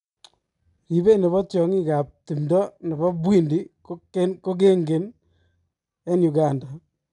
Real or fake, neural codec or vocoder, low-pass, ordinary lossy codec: real; none; 10.8 kHz; none